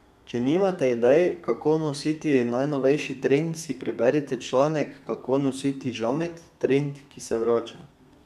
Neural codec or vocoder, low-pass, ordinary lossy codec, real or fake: codec, 32 kHz, 1.9 kbps, SNAC; 14.4 kHz; none; fake